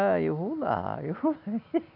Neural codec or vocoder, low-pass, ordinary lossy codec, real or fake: none; 5.4 kHz; none; real